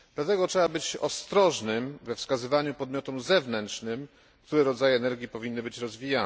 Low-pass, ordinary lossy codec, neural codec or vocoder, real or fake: none; none; none; real